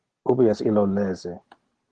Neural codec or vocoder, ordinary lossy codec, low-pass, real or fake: none; Opus, 16 kbps; 9.9 kHz; real